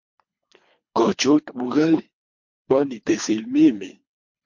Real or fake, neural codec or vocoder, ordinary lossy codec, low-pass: fake; codec, 24 kHz, 3 kbps, HILCodec; MP3, 48 kbps; 7.2 kHz